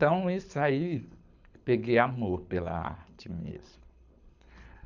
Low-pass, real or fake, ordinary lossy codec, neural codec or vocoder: 7.2 kHz; fake; none; codec, 24 kHz, 6 kbps, HILCodec